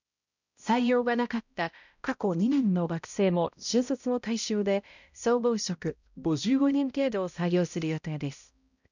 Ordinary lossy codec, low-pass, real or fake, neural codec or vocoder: none; 7.2 kHz; fake; codec, 16 kHz, 0.5 kbps, X-Codec, HuBERT features, trained on balanced general audio